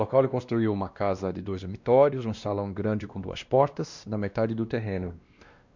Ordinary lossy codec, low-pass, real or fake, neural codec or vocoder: Opus, 64 kbps; 7.2 kHz; fake; codec, 16 kHz, 1 kbps, X-Codec, WavLM features, trained on Multilingual LibriSpeech